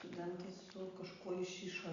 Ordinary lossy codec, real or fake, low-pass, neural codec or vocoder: Opus, 64 kbps; real; 7.2 kHz; none